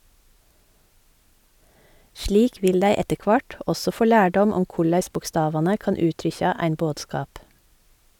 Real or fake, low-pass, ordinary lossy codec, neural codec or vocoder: real; 19.8 kHz; none; none